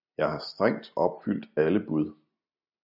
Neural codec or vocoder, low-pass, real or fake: none; 5.4 kHz; real